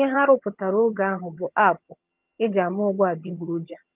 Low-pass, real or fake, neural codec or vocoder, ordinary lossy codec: 3.6 kHz; fake; vocoder, 22.05 kHz, 80 mel bands, HiFi-GAN; Opus, 32 kbps